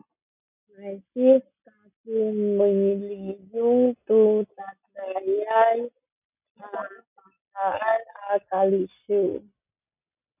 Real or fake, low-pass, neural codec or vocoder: real; 3.6 kHz; none